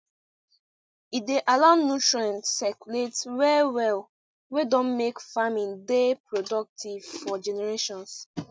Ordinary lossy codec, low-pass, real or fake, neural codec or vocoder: none; none; real; none